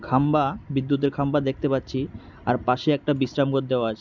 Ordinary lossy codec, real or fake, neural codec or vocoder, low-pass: none; real; none; 7.2 kHz